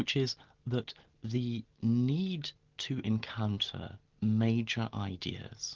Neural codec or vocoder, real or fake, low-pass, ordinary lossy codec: none; real; 7.2 kHz; Opus, 16 kbps